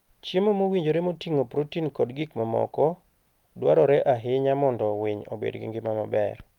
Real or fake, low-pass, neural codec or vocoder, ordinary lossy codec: real; 19.8 kHz; none; Opus, 32 kbps